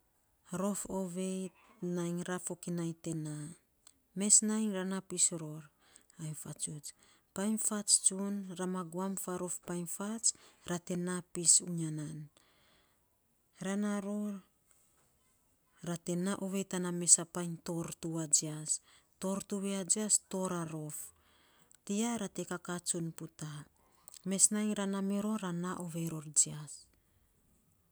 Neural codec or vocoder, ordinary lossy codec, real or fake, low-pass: none; none; real; none